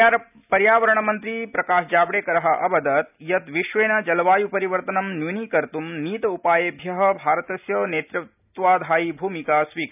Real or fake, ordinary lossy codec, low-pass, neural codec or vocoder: real; none; 3.6 kHz; none